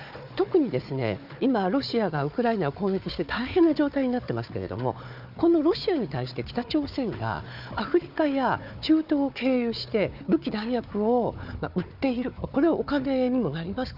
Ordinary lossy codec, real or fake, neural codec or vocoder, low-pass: none; fake; codec, 16 kHz, 4 kbps, FunCodec, trained on Chinese and English, 50 frames a second; 5.4 kHz